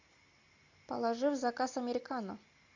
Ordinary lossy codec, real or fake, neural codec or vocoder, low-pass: AAC, 48 kbps; real; none; 7.2 kHz